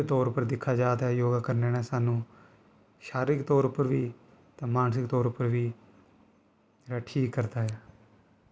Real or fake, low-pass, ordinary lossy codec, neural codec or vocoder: real; none; none; none